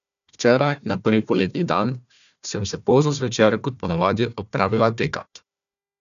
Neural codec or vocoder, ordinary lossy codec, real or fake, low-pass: codec, 16 kHz, 1 kbps, FunCodec, trained on Chinese and English, 50 frames a second; none; fake; 7.2 kHz